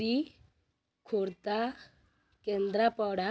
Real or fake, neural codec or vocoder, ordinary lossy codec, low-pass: real; none; none; none